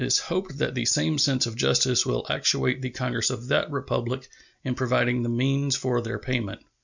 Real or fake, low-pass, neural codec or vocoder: real; 7.2 kHz; none